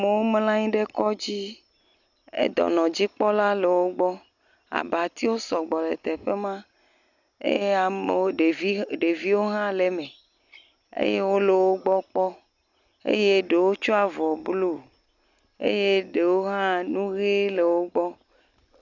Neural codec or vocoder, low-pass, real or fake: none; 7.2 kHz; real